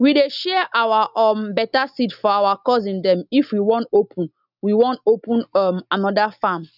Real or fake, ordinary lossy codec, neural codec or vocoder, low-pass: real; none; none; 5.4 kHz